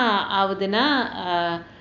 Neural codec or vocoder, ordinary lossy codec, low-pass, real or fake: none; none; 7.2 kHz; real